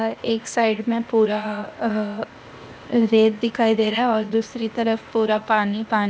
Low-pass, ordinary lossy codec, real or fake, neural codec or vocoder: none; none; fake; codec, 16 kHz, 0.8 kbps, ZipCodec